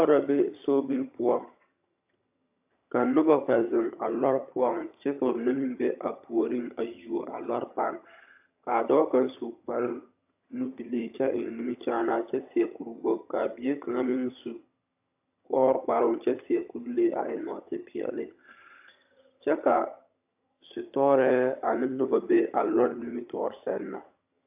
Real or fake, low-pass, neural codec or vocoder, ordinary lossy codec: fake; 3.6 kHz; vocoder, 22.05 kHz, 80 mel bands, HiFi-GAN; AAC, 32 kbps